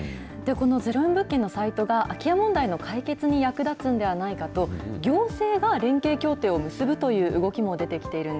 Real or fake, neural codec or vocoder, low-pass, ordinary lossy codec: real; none; none; none